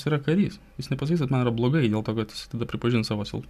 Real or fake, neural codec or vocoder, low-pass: real; none; 14.4 kHz